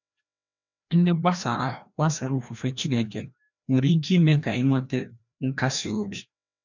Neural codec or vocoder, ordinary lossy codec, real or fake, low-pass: codec, 16 kHz, 1 kbps, FreqCodec, larger model; none; fake; 7.2 kHz